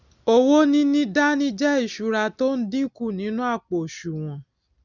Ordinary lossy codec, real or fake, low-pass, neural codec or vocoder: none; real; 7.2 kHz; none